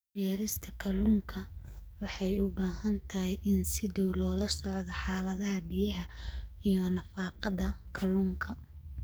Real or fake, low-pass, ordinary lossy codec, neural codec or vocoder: fake; none; none; codec, 44.1 kHz, 2.6 kbps, SNAC